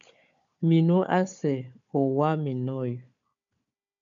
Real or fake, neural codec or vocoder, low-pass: fake; codec, 16 kHz, 4 kbps, FunCodec, trained on Chinese and English, 50 frames a second; 7.2 kHz